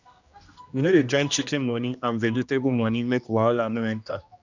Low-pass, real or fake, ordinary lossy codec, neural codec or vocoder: 7.2 kHz; fake; none; codec, 16 kHz, 1 kbps, X-Codec, HuBERT features, trained on general audio